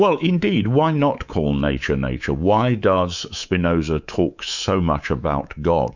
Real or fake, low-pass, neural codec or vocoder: fake; 7.2 kHz; codec, 24 kHz, 3.1 kbps, DualCodec